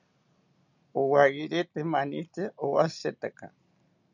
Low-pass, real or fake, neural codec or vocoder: 7.2 kHz; real; none